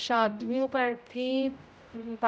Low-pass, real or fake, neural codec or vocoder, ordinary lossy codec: none; fake; codec, 16 kHz, 0.5 kbps, X-Codec, HuBERT features, trained on general audio; none